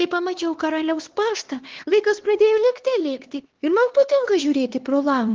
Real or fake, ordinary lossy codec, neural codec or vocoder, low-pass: fake; Opus, 16 kbps; codec, 16 kHz, 2 kbps, X-Codec, HuBERT features, trained on LibriSpeech; 7.2 kHz